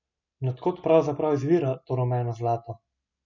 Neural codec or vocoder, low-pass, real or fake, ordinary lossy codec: none; 7.2 kHz; real; none